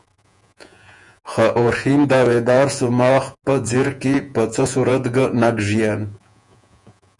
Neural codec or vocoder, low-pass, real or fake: vocoder, 48 kHz, 128 mel bands, Vocos; 10.8 kHz; fake